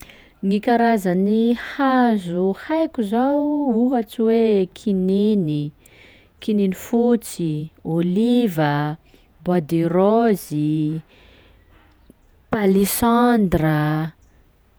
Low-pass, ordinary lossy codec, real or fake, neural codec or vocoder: none; none; fake; vocoder, 48 kHz, 128 mel bands, Vocos